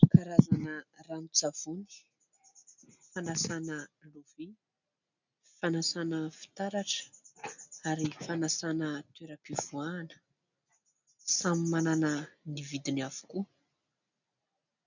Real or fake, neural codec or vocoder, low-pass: real; none; 7.2 kHz